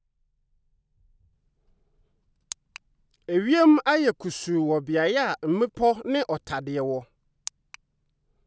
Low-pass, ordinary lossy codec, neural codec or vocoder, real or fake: none; none; none; real